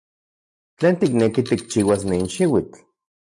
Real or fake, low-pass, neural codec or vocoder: real; 10.8 kHz; none